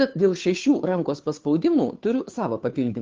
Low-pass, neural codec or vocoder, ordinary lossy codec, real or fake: 7.2 kHz; codec, 16 kHz, 2 kbps, FunCodec, trained on Chinese and English, 25 frames a second; Opus, 32 kbps; fake